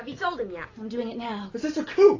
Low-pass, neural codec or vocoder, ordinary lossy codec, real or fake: 7.2 kHz; none; AAC, 32 kbps; real